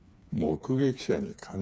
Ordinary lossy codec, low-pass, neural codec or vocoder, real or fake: none; none; codec, 16 kHz, 4 kbps, FreqCodec, smaller model; fake